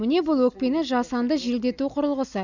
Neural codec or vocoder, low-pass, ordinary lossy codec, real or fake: none; 7.2 kHz; none; real